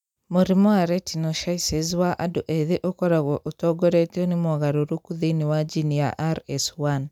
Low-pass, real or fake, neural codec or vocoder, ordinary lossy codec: 19.8 kHz; real; none; none